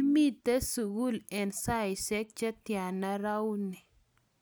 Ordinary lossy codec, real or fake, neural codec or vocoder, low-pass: none; real; none; none